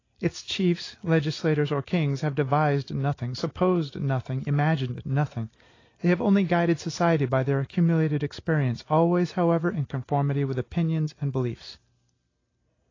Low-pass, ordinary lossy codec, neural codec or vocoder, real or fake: 7.2 kHz; AAC, 32 kbps; none; real